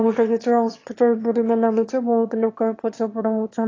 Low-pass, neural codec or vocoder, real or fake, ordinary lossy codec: 7.2 kHz; autoencoder, 22.05 kHz, a latent of 192 numbers a frame, VITS, trained on one speaker; fake; AAC, 32 kbps